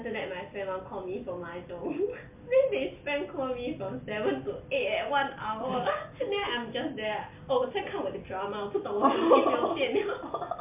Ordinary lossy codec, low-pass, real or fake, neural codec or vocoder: MP3, 32 kbps; 3.6 kHz; real; none